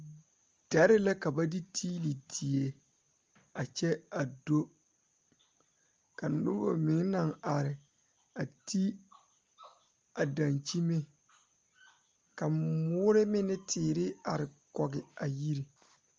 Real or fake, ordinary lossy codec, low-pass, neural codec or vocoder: real; Opus, 32 kbps; 7.2 kHz; none